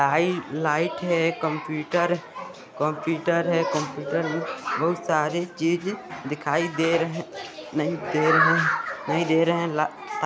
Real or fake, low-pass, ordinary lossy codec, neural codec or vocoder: real; none; none; none